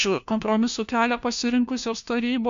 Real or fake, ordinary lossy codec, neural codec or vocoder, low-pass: fake; MP3, 64 kbps; codec, 16 kHz, 1 kbps, FunCodec, trained on LibriTTS, 50 frames a second; 7.2 kHz